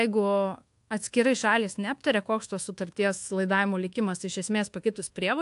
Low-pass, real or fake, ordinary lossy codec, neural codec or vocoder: 10.8 kHz; fake; AAC, 64 kbps; codec, 24 kHz, 1.2 kbps, DualCodec